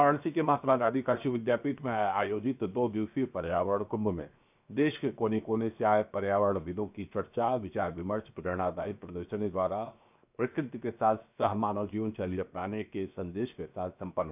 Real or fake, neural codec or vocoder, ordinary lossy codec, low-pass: fake; codec, 16 kHz, 0.7 kbps, FocalCodec; none; 3.6 kHz